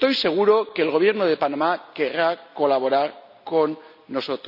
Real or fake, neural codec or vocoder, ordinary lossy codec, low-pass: real; none; none; 5.4 kHz